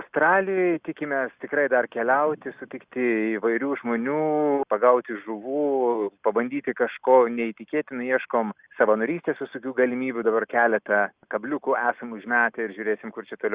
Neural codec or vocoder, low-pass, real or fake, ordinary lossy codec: none; 3.6 kHz; real; Opus, 64 kbps